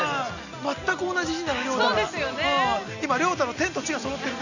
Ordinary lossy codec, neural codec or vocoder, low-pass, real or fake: none; none; 7.2 kHz; real